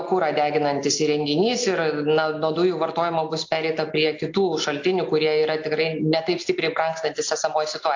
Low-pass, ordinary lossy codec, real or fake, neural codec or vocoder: 7.2 kHz; AAC, 48 kbps; real; none